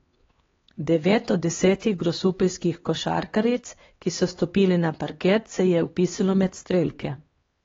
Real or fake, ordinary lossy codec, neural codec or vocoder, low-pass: fake; AAC, 24 kbps; codec, 16 kHz, 2 kbps, X-Codec, HuBERT features, trained on LibriSpeech; 7.2 kHz